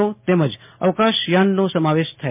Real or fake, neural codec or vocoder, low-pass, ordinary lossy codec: real; none; 3.6 kHz; MP3, 32 kbps